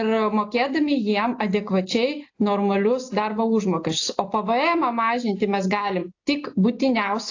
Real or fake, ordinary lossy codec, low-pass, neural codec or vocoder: real; AAC, 48 kbps; 7.2 kHz; none